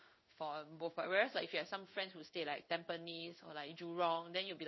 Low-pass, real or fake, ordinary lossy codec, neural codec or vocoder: 7.2 kHz; fake; MP3, 24 kbps; codec, 16 kHz in and 24 kHz out, 1 kbps, XY-Tokenizer